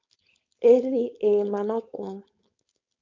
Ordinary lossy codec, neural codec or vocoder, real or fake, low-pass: MP3, 48 kbps; codec, 16 kHz, 4.8 kbps, FACodec; fake; 7.2 kHz